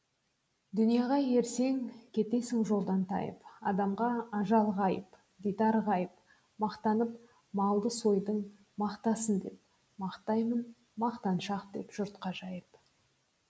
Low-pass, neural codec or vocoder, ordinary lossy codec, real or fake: none; none; none; real